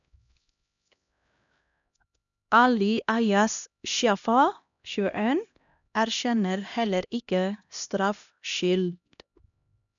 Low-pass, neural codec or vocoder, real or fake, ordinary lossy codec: 7.2 kHz; codec, 16 kHz, 1 kbps, X-Codec, HuBERT features, trained on LibriSpeech; fake; none